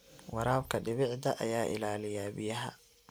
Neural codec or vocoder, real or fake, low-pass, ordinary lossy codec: none; real; none; none